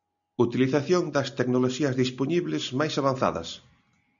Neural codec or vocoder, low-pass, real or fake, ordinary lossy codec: none; 7.2 kHz; real; MP3, 96 kbps